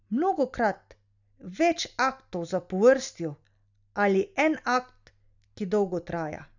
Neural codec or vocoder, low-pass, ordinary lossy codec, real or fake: none; 7.2 kHz; none; real